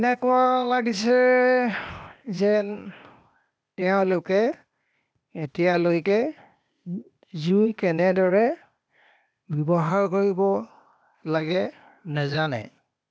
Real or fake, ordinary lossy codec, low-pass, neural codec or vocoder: fake; none; none; codec, 16 kHz, 0.8 kbps, ZipCodec